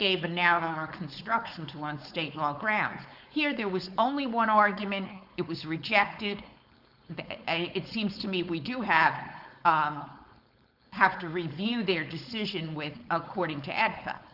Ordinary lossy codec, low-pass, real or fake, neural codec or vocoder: Opus, 64 kbps; 5.4 kHz; fake; codec, 16 kHz, 4.8 kbps, FACodec